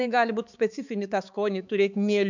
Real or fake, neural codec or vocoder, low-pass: fake; codec, 16 kHz, 4 kbps, X-Codec, HuBERT features, trained on balanced general audio; 7.2 kHz